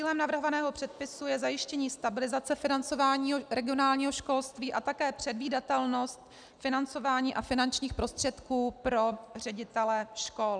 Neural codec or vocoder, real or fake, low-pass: none; real; 9.9 kHz